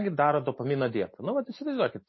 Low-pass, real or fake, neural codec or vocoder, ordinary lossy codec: 7.2 kHz; real; none; MP3, 24 kbps